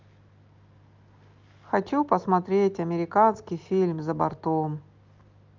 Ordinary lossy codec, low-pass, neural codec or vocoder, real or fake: Opus, 32 kbps; 7.2 kHz; none; real